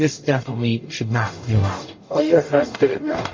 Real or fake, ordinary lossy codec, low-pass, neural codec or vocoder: fake; MP3, 32 kbps; 7.2 kHz; codec, 44.1 kHz, 0.9 kbps, DAC